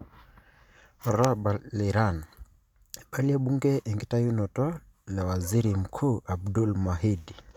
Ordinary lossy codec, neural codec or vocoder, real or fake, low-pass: none; none; real; 19.8 kHz